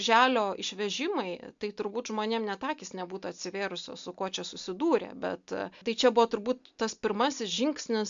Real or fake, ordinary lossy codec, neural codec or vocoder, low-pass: real; MP3, 64 kbps; none; 7.2 kHz